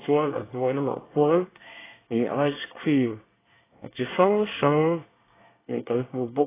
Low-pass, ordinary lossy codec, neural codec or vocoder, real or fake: 3.6 kHz; AAC, 16 kbps; codec, 24 kHz, 1 kbps, SNAC; fake